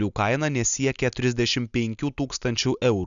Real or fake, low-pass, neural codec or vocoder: real; 7.2 kHz; none